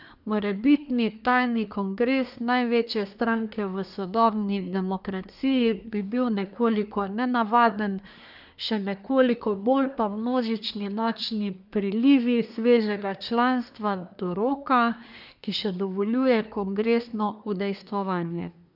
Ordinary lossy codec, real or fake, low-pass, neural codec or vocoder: none; fake; 5.4 kHz; codec, 24 kHz, 1 kbps, SNAC